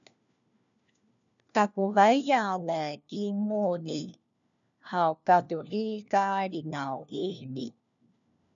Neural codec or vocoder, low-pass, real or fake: codec, 16 kHz, 1 kbps, FunCodec, trained on LibriTTS, 50 frames a second; 7.2 kHz; fake